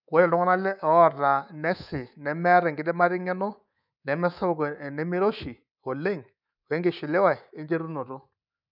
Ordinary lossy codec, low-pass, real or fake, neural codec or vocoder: none; 5.4 kHz; fake; codec, 24 kHz, 3.1 kbps, DualCodec